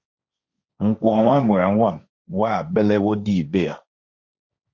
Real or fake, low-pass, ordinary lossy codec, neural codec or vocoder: fake; 7.2 kHz; Opus, 64 kbps; codec, 16 kHz, 1.1 kbps, Voila-Tokenizer